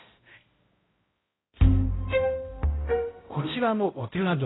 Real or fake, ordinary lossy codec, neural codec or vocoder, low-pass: fake; AAC, 16 kbps; codec, 16 kHz, 0.5 kbps, X-Codec, HuBERT features, trained on balanced general audio; 7.2 kHz